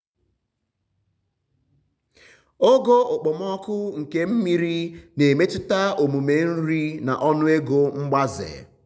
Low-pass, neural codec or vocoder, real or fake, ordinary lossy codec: none; none; real; none